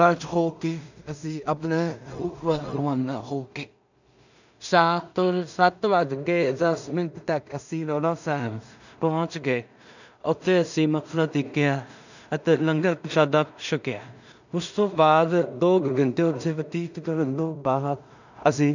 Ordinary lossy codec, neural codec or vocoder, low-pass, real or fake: none; codec, 16 kHz in and 24 kHz out, 0.4 kbps, LongCat-Audio-Codec, two codebook decoder; 7.2 kHz; fake